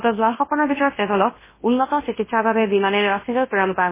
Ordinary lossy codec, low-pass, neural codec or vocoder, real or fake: MP3, 16 kbps; 3.6 kHz; codec, 24 kHz, 0.9 kbps, WavTokenizer, large speech release; fake